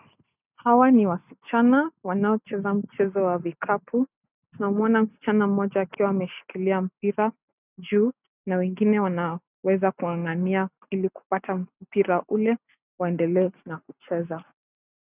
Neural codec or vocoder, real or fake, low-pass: none; real; 3.6 kHz